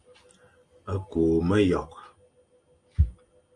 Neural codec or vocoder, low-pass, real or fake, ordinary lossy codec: none; 9.9 kHz; real; Opus, 32 kbps